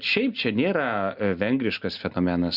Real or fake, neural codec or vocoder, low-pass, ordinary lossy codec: real; none; 5.4 kHz; Opus, 64 kbps